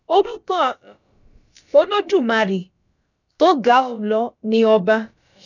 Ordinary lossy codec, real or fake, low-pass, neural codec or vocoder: none; fake; 7.2 kHz; codec, 16 kHz, about 1 kbps, DyCAST, with the encoder's durations